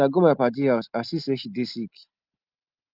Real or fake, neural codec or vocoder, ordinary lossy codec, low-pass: real; none; Opus, 24 kbps; 5.4 kHz